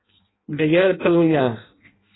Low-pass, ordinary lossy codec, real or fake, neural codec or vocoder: 7.2 kHz; AAC, 16 kbps; fake; codec, 16 kHz in and 24 kHz out, 0.6 kbps, FireRedTTS-2 codec